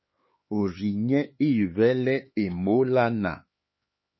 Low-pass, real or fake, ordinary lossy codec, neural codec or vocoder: 7.2 kHz; fake; MP3, 24 kbps; codec, 16 kHz, 4 kbps, X-Codec, HuBERT features, trained on LibriSpeech